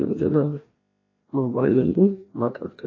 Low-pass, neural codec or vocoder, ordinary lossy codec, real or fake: 7.2 kHz; codec, 16 kHz, 1 kbps, FreqCodec, larger model; AAC, 32 kbps; fake